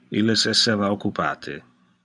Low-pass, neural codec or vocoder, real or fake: 10.8 kHz; vocoder, 44.1 kHz, 128 mel bands every 512 samples, BigVGAN v2; fake